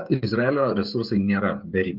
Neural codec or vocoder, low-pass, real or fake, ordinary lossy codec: codec, 16 kHz, 16 kbps, FreqCodec, smaller model; 5.4 kHz; fake; Opus, 32 kbps